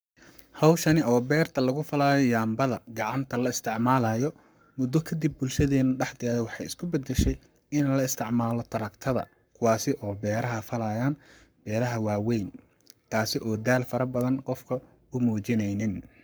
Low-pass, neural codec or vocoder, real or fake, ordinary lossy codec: none; codec, 44.1 kHz, 7.8 kbps, Pupu-Codec; fake; none